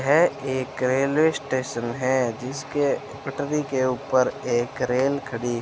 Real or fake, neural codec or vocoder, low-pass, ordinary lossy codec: real; none; none; none